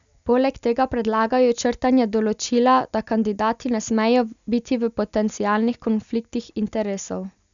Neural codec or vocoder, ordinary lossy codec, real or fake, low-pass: none; none; real; 7.2 kHz